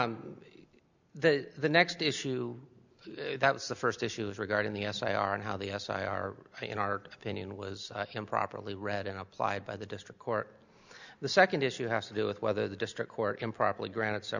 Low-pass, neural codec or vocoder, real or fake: 7.2 kHz; none; real